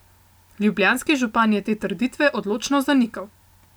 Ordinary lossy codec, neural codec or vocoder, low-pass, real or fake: none; none; none; real